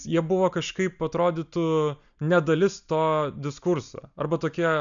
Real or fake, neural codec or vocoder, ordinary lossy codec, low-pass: real; none; MP3, 96 kbps; 7.2 kHz